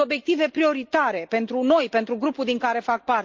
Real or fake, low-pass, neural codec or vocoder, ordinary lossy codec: real; 7.2 kHz; none; Opus, 24 kbps